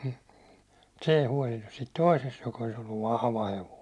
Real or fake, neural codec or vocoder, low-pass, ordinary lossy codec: real; none; none; none